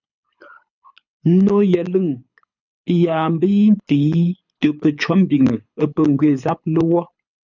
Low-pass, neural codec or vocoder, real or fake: 7.2 kHz; codec, 24 kHz, 6 kbps, HILCodec; fake